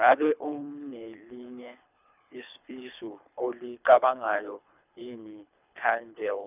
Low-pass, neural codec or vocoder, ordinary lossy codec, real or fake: 3.6 kHz; codec, 24 kHz, 3 kbps, HILCodec; none; fake